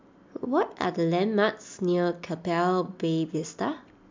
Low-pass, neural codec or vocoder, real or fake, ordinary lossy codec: 7.2 kHz; none; real; MP3, 64 kbps